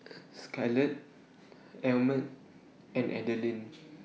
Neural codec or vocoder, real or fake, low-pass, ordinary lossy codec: none; real; none; none